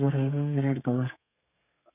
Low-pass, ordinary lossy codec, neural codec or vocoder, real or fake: 3.6 kHz; none; codec, 32 kHz, 1.9 kbps, SNAC; fake